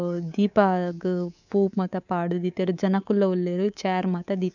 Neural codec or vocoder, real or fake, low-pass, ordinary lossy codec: codec, 24 kHz, 3.1 kbps, DualCodec; fake; 7.2 kHz; none